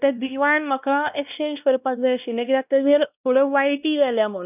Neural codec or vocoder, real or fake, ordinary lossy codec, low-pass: codec, 16 kHz, 1 kbps, X-Codec, WavLM features, trained on Multilingual LibriSpeech; fake; none; 3.6 kHz